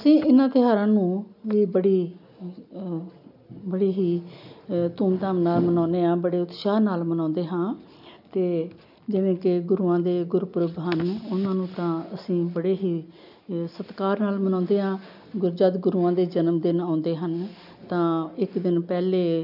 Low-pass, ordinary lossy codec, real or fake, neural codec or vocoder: 5.4 kHz; none; real; none